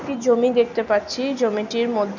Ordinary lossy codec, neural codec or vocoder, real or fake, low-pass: none; none; real; 7.2 kHz